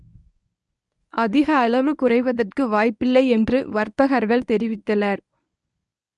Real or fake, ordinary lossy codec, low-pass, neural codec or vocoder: fake; none; 10.8 kHz; codec, 24 kHz, 0.9 kbps, WavTokenizer, medium speech release version 1